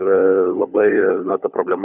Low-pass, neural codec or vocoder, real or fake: 3.6 kHz; codec, 16 kHz, 16 kbps, FunCodec, trained on LibriTTS, 50 frames a second; fake